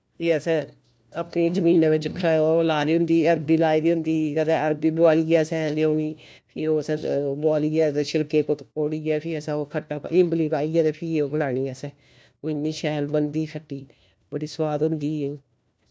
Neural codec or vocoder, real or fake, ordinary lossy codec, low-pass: codec, 16 kHz, 1 kbps, FunCodec, trained on LibriTTS, 50 frames a second; fake; none; none